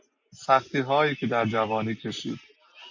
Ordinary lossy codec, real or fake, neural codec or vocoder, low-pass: MP3, 48 kbps; real; none; 7.2 kHz